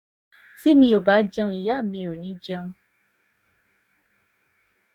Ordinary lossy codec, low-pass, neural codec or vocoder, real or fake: none; 19.8 kHz; codec, 44.1 kHz, 2.6 kbps, DAC; fake